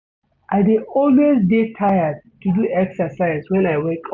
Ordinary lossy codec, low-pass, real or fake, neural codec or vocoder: none; 7.2 kHz; real; none